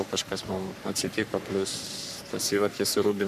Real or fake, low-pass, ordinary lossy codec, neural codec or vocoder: fake; 14.4 kHz; MP3, 96 kbps; codec, 44.1 kHz, 3.4 kbps, Pupu-Codec